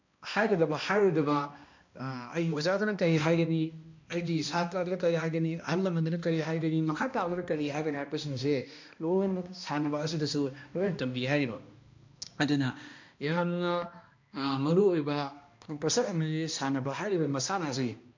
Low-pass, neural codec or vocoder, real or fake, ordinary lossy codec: 7.2 kHz; codec, 16 kHz, 1 kbps, X-Codec, HuBERT features, trained on balanced general audio; fake; MP3, 48 kbps